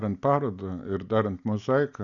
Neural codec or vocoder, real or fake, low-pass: none; real; 7.2 kHz